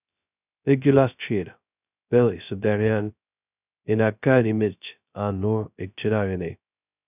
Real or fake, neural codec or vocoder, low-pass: fake; codec, 16 kHz, 0.2 kbps, FocalCodec; 3.6 kHz